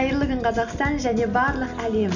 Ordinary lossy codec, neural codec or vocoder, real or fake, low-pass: none; none; real; 7.2 kHz